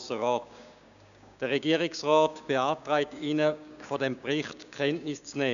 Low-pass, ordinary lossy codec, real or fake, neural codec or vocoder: 7.2 kHz; none; fake; codec, 16 kHz, 6 kbps, DAC